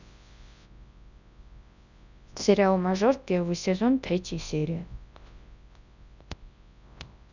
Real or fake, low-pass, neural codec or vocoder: fake; 7.2 kHz; codec, 24 kHz, 0.9 kbps, WavTokenizer, large speech release